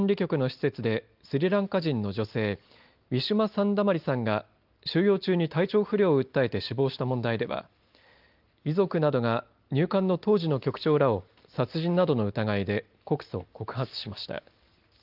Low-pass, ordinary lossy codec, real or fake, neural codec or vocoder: 5.4 kHz; Opus, 32 kbps; real; none